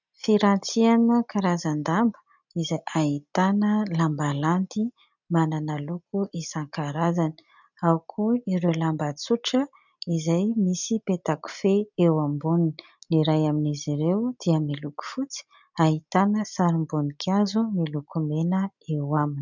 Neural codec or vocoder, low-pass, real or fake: none; 7.2 kHz; real